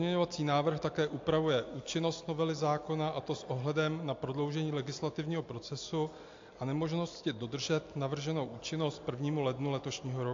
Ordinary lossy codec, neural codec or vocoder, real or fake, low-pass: AAC, 48 kbps; none; real; 7.2 kHz